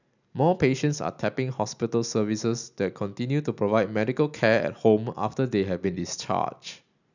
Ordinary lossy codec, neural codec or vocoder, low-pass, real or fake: none; none; 7.2 kHz; real